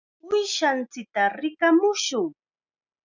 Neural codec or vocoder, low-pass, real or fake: none; 7.2 kHz; real